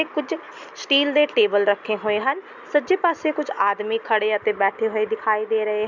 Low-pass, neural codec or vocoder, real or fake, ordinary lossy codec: 7.2 kHz; none; real; none